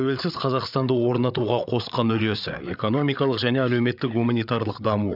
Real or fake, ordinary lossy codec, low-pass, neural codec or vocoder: fake; none; 5.4 kHz; codec, 16 kHz, 8 kbps, FreqCodec, larger model